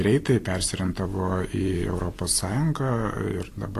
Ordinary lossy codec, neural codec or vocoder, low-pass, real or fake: AAC, 48 kbps; none; 14.4 kHz; real